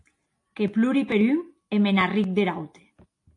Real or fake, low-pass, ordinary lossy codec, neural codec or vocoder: real; 10.8 kHz; AAC, 48 kbps; none